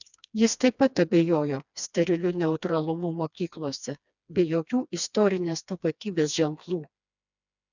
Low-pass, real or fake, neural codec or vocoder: 7.2 kHz; fake; codec, 16 kHz, 2 kbps, FreqCodec, smaller model